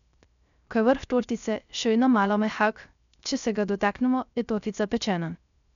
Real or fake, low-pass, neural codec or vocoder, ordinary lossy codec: fake; 7.2 kHz; codec, 16 kHz, 0.3 kbps, FocalCodec; MP3, 96 kbps